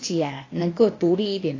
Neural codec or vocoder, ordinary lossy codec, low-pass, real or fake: codec, 16 kHz, 0.8 kbps, ZipCodec; AAC, 32 kbps; 7.2 kHz; fake